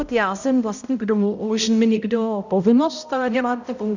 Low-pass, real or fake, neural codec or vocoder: 7.2 kHz; fake; codec, 16 kHz, 0.5 kbps, X-Codec, HuBERT features, trained on balanced general audio